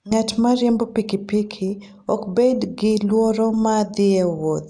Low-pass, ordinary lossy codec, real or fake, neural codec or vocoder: 9.9 kHz; none; real; none